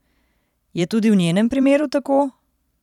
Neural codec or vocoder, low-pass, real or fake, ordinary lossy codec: vocoder, 44.1 kHz, 128 mel bands every 512 samples, BigVGAN v2; 19.8 kHz; fake; none